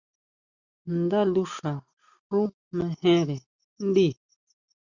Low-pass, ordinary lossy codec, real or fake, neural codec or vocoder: 7.2 kHz; Opus, 64 kbps; real; none